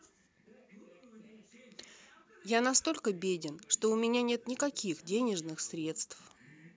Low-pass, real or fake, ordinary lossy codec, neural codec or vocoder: none; real; none; none